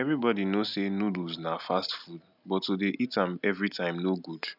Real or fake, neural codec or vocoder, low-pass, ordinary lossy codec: real; none; 5.4 kHz; none